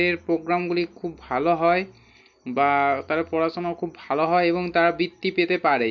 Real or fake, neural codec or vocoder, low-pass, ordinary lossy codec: real; none; 7.2 kHz; none